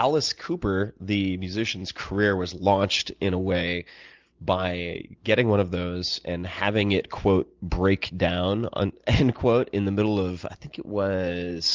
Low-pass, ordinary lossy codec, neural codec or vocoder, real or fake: 7.2 kHz; Opus, 24 kbps; none; real